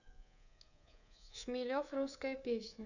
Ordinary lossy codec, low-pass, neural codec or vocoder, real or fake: none; 7.2 kHz; codec, 24 kHz, 3.1 kbps, DualCodec; fake